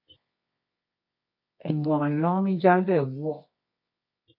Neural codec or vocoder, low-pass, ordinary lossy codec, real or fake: codec, 24 kHz, 0.9 kbps, WavTokenizer, medium music audio release; 5.4 kHz; MP3, 32 kbps; fake